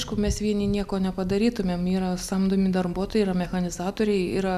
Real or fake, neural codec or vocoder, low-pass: real; none; 14.4 kHz